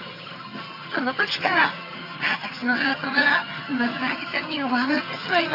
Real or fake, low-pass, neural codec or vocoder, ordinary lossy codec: fake; 5.4 kHz; vocoder, 22.05 kHz, 80 mel bands, HiFi-GAN; AAC, 32 kbps